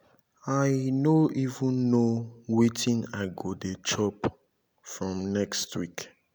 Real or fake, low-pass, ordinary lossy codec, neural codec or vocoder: real; none; none; none